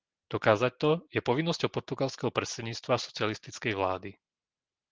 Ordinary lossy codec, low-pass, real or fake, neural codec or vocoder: Opus, 16 kbps; 7.2 kHz; real; none